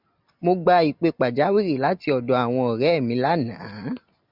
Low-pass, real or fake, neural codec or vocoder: 5.4 kHz; real; none